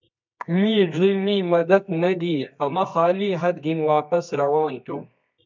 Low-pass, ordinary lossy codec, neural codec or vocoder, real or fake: 7.2 kHz; MP3, 64 kbps; codec, 24 kHz, 0.9 kbps, WavTokenizer, medium music audio release; fake